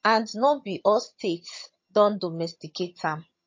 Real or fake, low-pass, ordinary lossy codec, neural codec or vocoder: fake; 7.2 kHz; MP3, 32 kbps; vocoder, 22.05 kHz, 80 mel bands, HiFi-GAN